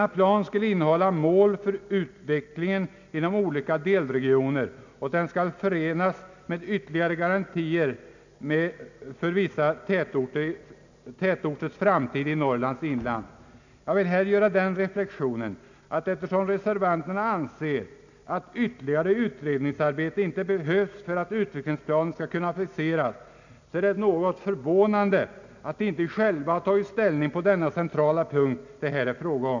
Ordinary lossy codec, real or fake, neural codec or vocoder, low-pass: none; real; none; 7.2 kHz